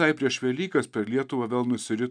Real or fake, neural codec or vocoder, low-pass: real; none; 9.9 kHz